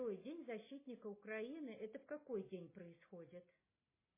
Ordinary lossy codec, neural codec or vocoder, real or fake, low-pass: MP3, 16 kbps; none; real; 3.6 kHz